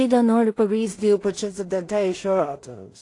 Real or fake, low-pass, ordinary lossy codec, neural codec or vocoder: fake; 10.8 kHz; AAC, 48 kbps; codec, 16 kHz in and 24 kHz out, 0.4 kbps, LongCat-Audio-Codec, two codebook decoder